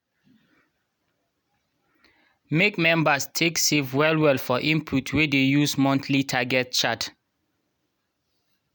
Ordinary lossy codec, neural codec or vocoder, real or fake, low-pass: none; none; real; none